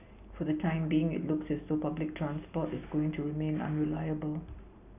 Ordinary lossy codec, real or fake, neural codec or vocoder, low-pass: none; real; none; 3.6 kHz